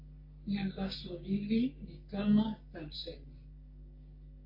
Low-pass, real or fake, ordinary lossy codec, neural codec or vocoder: 5.4 kHz; fake; MP3, 32 kbps; codec, 44.1 kHz, 3.4 kbps, Pupu-Codec